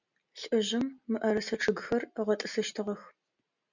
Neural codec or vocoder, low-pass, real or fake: none; 7.2 kHz; real